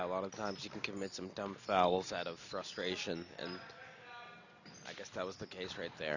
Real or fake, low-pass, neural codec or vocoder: real; 7.2 kHz; none